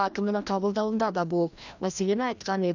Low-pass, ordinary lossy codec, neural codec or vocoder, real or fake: 7.2 kHz; none; codec, 16 kHz, 1 kbps, FreqCodec, larger model; fake